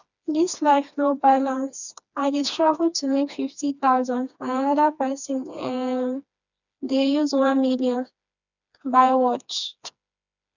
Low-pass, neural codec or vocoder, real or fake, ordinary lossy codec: 7.2 kHz; codec, 16 kHz, 2 kbps, FreqCodec, smaller model; fake; none